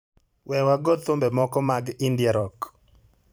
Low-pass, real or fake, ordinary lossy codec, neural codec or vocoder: none; fake; none; vocoder, 44.1 kHz, 128 mel bands every 512 samples, BigVGAN v2